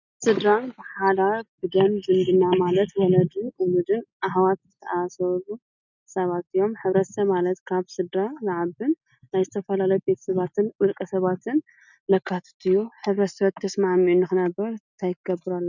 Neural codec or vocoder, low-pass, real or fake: none; 7.2 kHz; real